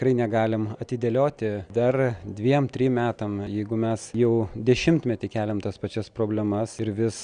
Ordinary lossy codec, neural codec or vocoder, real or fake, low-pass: Opus, 64 kbps; none; real; 9.9 kHz